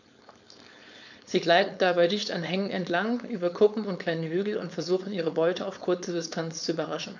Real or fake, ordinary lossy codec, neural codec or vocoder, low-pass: fake; none; codec, 16 kHz, 4.8 kbps, FACodec; 7.2 kHz